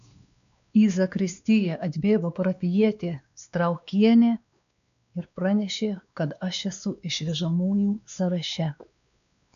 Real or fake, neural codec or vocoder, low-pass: fake; codec, 16 kHz, 2 kbps, X-Codec, WavLM features, trained on Multilingual LibriSpeech; 7.2 kHz